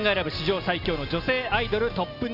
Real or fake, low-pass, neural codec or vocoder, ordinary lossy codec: real; 5.4 kHz; none; none